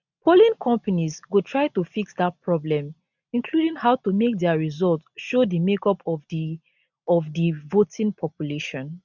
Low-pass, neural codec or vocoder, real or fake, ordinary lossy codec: 7.2 kHz; none; real; none